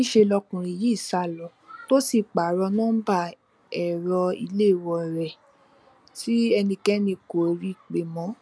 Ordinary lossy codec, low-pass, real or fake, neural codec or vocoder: none; none; real; none